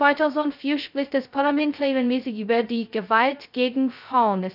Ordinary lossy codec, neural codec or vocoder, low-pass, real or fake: none; codec, 16 kHz, 0.2 kbps, FocalCodec; 5.4 kHz; fake